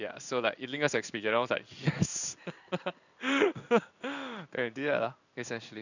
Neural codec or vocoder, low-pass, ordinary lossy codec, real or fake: codec, 16 kHz in and 24 kHz out, 1 kbps, XY-Tokenizer; 7.2 kHz; none; fake